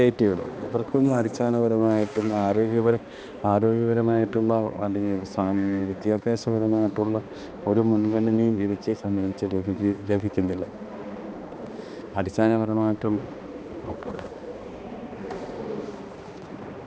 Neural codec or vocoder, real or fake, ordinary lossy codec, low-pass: codec, 16 kHz, 2 kbps, X-Codec, HuBERT features, trained on balanced general audio; fake; none; none